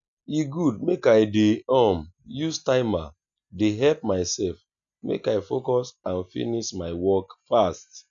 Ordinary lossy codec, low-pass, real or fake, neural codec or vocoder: none; 7.2 kHz; real; none